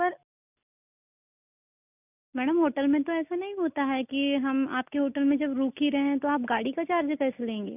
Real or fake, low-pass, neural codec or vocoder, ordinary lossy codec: real; 3.6 kHz; none; none